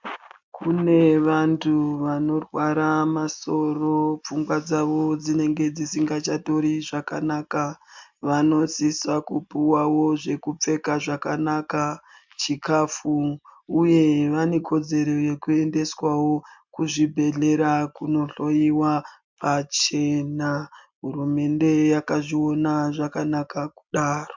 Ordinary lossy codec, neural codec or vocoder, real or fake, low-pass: MP3, 64 kbps; none; real; 7.2 kHz